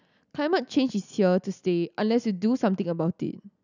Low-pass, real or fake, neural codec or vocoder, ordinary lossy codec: 7.2 kHz; real; none; none